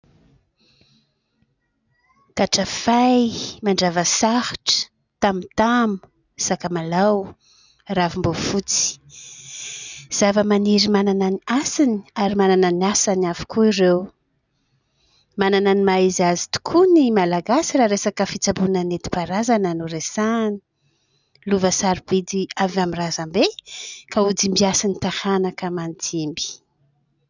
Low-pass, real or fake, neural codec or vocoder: 7.2 kHz; real; none